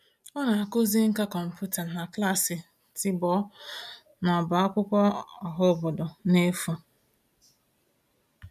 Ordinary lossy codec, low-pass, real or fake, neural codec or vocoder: none; 14.4 kHz; real; none